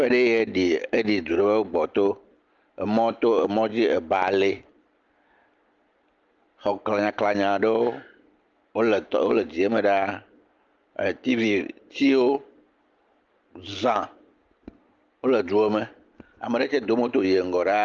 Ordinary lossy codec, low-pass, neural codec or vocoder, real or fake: Opus, 24 kbps; 7.2 kHz; none; real